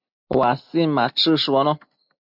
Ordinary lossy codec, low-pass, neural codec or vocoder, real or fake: MP3, 48 kbps; 5.4 kHz; none; real